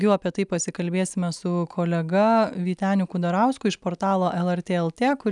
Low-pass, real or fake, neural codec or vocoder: 10.8 kHz; real; none